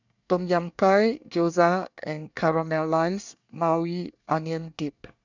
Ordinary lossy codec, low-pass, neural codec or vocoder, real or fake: none; 7.2 kHz; codec, 24 kHz, 1 kbps, SNAC; fake